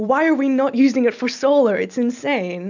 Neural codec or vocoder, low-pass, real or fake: none; 7.2 kHz; real